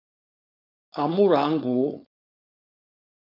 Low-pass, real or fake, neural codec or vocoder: 5.4 kHz; fake; codec, 16 kHz, 4.8 kbps, FACodec